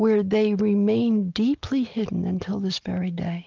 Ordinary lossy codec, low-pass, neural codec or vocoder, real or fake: Opus, 24 kbps; 7.2 kHz; none; real